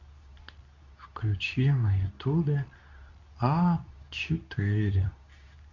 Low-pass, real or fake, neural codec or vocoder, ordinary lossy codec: 7.2 kHz; fake; codec, 24 kHz, 0.9 kbps, WavTokenizer, medium speech release version 2; none